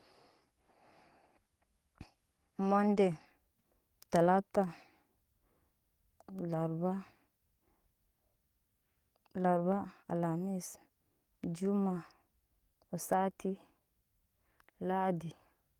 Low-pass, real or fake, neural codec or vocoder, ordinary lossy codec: 19.8 kHz; fake; codec, 44.1 kHz, 7.8 kbps, DAC; Opus, 24 kbps